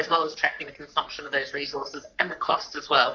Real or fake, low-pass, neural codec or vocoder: fake; 7.2 kHz; codec, 44.1 kHz, 3.4 kbps, Pupu-Codec